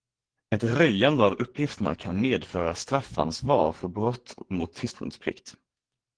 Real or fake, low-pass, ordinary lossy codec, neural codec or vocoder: fake; 9.9 kHz; Opus, 16 kbps; codec, 44.1 kHz, 2.6 kbps, SNAC